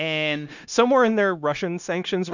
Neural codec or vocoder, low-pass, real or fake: codec, 16 kHz, 0.9 kbps, LongCat-Audio-Codec; 7.2 kHz; fake